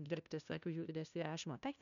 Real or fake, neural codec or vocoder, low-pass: fake; codec, 16 kHz, 0.5 kbps, FunCodec, trained on LibriTTS, 25 frames a second; 7.2 kHz